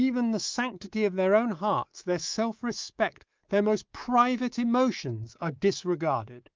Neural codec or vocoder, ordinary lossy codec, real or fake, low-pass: codec, 24 kHz, 3.1 kbps, DualCodec; Opus, 24 kbps; fake; 7.2 kHz